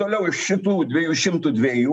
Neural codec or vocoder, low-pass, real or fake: none; 9.9 kHz; real